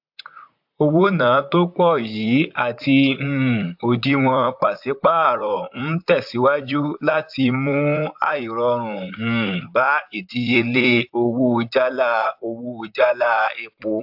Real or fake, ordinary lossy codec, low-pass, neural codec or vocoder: fake; AAC, 48 kbps; 5.4 kHz; vocoder, 44.1 kHz, 128 mel bands, Pupu-Vocoder